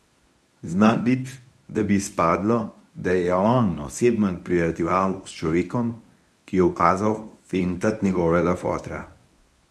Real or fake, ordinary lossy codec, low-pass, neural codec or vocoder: fake; none; none; codec, 24 kHz, 0.9 kbps, WavTokenizer, medium speech release version 1